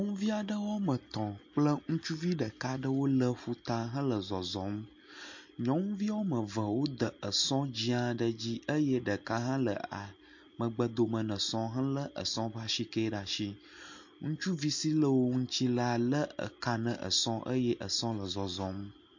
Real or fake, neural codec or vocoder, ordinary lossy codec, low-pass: real; none; MP3, 48 kbps; 7.2 kHz